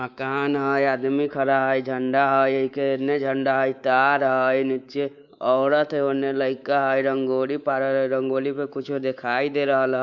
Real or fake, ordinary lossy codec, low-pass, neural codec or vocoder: fake; none; 7.2 kHz; codec, 24 kHz, 3.1 kbps, DualCodec